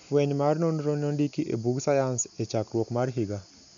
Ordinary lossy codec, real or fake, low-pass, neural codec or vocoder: none; real; 7.2 kHz; none